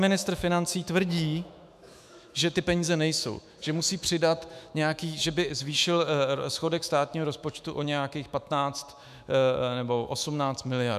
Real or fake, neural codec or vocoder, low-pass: fake; autoencoder, 48 kHz, 128 numbers a frame, DAC-VAE, trained on Japanese speech; 14.4 kHz